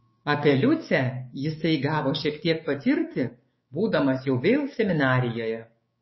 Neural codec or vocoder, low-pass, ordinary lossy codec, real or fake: codec, 16 kHz, 6 kbps, DAC; 7.2 kHz; MP3, 24 kbps; fake